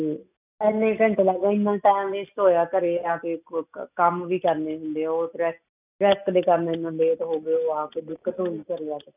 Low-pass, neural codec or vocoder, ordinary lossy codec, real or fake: 3.6 kHz; none; none; real